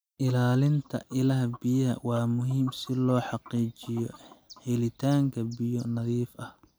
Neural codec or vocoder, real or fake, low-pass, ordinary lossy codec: none; real; none; none